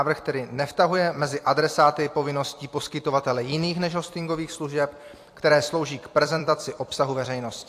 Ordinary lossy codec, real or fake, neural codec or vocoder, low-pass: AAC, 64 kbps; fake; vocoder, 44.1 kHz, 128 mel bands every 256 samples, BigVGAN v2; 14.4 kHz